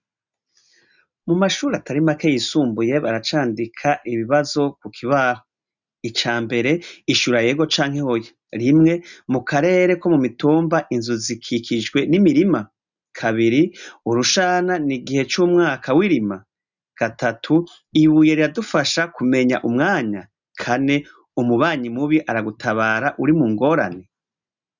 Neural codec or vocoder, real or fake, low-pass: none; real; 7.2 kHz